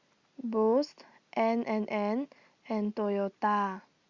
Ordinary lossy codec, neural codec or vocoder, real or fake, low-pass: Opus, 64 kbps; none; real; 7.2 kHz